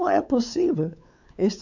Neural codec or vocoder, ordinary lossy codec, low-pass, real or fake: codec, 16 kHz, 4 kbps, X-Codec, WavLM features, trained on Multilingual LibriSpeech; none; 7.2 kHz; fake